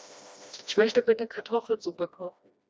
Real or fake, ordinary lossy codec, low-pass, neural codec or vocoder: fake; none; none; codec, 16 kHz, 1 kbps, FreqCodec, smaller model